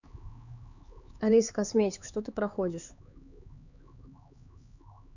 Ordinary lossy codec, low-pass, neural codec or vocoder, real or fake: none; 7.2 kHz; codec, 16 kHz, 4 kbps, X-Codec, HuBERT features, trained on LibriSpeech; fake